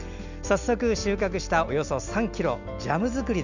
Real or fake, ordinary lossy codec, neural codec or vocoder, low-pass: real; none; none; 7.2 kHz